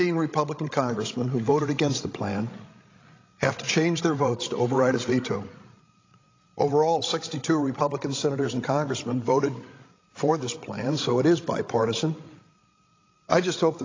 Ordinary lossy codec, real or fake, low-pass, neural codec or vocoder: AAC, 32 kbps; fake; 7.2 kHz; codec, 16 kHz, 16 kbps, FreqCodec, larger model